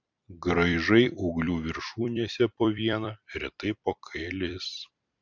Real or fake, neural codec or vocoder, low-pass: fake; vocoder, 44.1 kHz, 128 mel bands every 256 samples, BigVGAN v2; 7.2 kHz